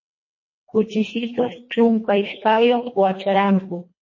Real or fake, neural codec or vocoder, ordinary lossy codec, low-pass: fake; codec, 24 kHz, 1.5 kbps, HILCodec; MP3, 32 kbps; 7.2 kHz